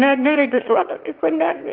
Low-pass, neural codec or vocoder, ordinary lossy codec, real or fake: 5.4 kHz; autoencoder, 22.05 kHz, a latent of 192 numbers a frame, VITS, trained on one speaker; Opus, 32 kbps; fake